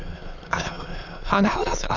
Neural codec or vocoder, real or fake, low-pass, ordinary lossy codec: autoencoder, 22.05 kHz, a latent of 192 numbers a frame, VITS, trained on many speakers; fake; 7.2 kHz; none